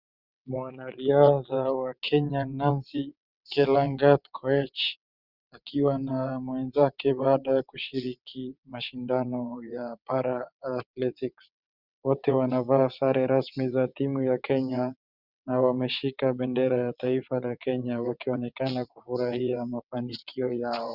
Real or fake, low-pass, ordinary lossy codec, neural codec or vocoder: fake; 5.4 kHz; Opus, 64 kbps; vocoder, 22.05 kHz, 80 mel bands, WaveNeXt